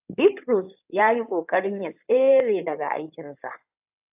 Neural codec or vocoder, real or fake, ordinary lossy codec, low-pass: codec, 16 kHz in and 24 kHz out, 2.2 kbps, FireRedTTS-2 codec; fake; AAC, 32 kbps; 3.6 kHz